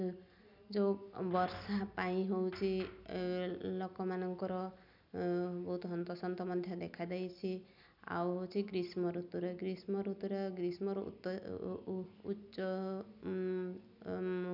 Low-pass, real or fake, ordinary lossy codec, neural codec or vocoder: 5.4 kHz; real; none; none